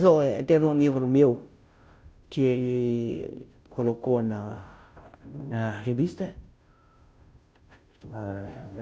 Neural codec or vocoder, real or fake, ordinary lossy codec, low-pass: codec, 16 kHz, 0.5 kbps, FunCodec, trained on Chinese and English, 25 frames a second; fake; none; none